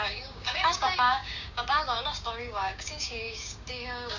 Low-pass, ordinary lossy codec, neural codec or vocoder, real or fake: 7.2 kHz; none; codec, 16 kHz, 6 kbps, DAC; fake